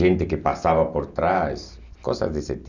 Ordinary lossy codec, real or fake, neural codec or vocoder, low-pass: none; real; none; 7.2 kHz